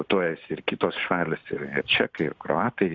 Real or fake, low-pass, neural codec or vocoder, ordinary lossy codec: real; 7.2 kHz; none; Opus, 64 kbps